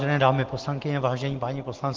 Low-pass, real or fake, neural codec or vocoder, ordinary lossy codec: 7.2 kHz; real; none; Opus, 16 kbps